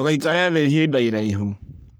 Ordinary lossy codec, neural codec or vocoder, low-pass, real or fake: none; codec, 44.1 kHz, 3.4 kbps, Pupu-Codec; none; fake